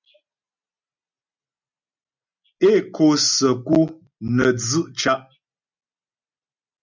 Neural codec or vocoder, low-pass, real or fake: none; 7.2 kHz; real